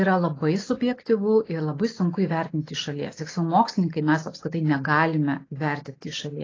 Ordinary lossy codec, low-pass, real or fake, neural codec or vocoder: AAC, 32 kbps; 7.2 kHz; real; none